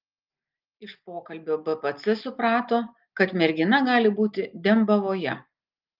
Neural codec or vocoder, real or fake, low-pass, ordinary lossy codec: none; real; 5.4 kHz; Opus, 24 kbps